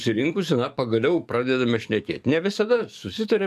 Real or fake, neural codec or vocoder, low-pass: fake; codec, 44.1 kHz, 7.8 kbps, DAC; 14.4 kHz